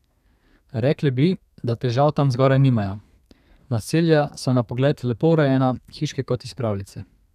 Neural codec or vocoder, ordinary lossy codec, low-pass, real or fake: codec, 32 kHz, 1.9 kbps, SNAC; none; 14.4 kHz; fake